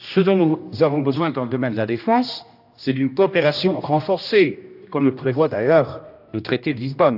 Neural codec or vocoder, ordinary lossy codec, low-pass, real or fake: codec, 16 kHz, 1 kbps, X-Codec, HuBERT features, trained on general audio; AAC, 48 kbps; 5.4 kHz; fake